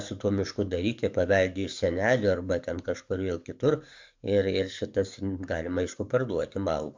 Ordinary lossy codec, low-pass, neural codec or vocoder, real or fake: MP3, 64 kbps; 7.2 kHz; codec, 44.1 kHz, 7.8 kbps, DAC; fake